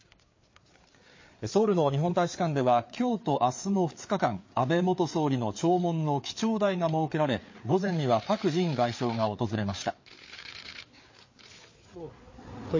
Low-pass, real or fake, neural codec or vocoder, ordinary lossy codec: 7.2 kHz; fake; codec, 16 kHz, 4 kbps, FreqCodec, larger model; MP3, 32 kbps